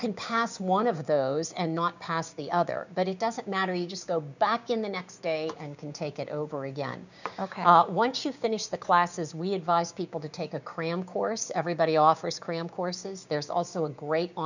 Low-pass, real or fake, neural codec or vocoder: 7.2 kHz; fake; codec, 16 kHz, 6 kbps, DAC